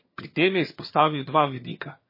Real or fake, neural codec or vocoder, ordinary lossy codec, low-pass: fake; vocoder, 22.05 kHz, 80 mel bands, HiFi-GAN; MP3, 24 kbps; 5.4 kHz